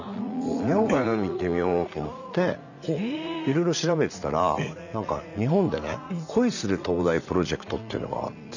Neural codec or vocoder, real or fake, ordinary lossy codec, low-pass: vocoder, 44.1 kHz, 80 mel bands, Vocos; fake; none; 7.2 kHz